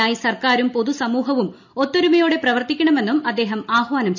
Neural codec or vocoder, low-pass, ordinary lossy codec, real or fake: none; 7.2 kHz; none; real